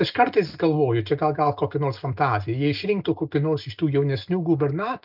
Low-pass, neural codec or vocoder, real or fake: 5.4 kHz; none; real